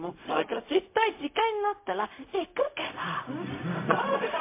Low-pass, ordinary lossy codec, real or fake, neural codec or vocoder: 3.6 kHz; MP3, 24 kbps; fake; codec, 16 kHz, 0.4 kbps, LongCat-Audio-Codec